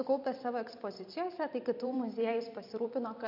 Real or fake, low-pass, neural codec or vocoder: fake; 5.4 kHz; vocoder, 44.1 kHz, 128 mel bands every 256 samples, BigVGAN v2